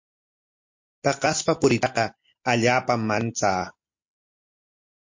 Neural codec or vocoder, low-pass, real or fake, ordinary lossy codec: none; 7.2 kHz; real; MP3, 48 kbps